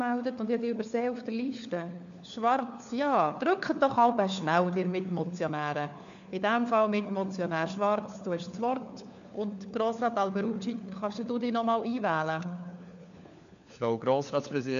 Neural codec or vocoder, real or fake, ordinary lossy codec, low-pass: codec, 16 kHz, 4 kbps, FunCodec, trained on LibriTTS, 50 frames a second; fake; none; 7.2 kHz